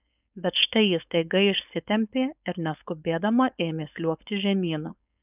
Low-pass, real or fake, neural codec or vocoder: 3.6 kHz; fake; codec, 16 kHz, 4.8 kbps, FACodec